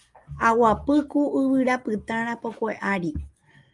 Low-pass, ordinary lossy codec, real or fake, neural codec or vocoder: 10.8 kHz; Opus, 32 kbps; real; none